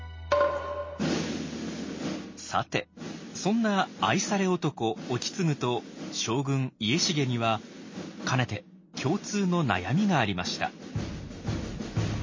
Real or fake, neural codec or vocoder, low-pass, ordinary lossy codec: real; none; 7.2 kHz; MP3, 32 kbps